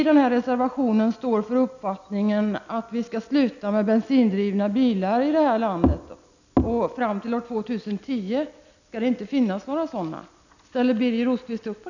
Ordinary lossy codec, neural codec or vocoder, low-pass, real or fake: none; none; 7.2 kHz; real